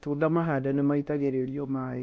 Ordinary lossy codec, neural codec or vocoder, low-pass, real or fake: none; codec, 16 kHz, 1 kbps, X-Codec, WavLM features, trained on Multilingual LibriSpeech; none; fake